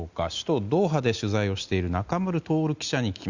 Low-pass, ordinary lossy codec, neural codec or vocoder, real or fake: 7.2 kHz; Opus, 64 kbps; none; real